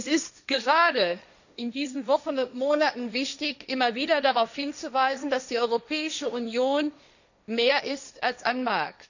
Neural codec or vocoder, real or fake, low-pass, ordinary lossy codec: codec, 16 kHz, 1.1 kbps, Voila-Tokenizer; fake; 7.2 kHz; none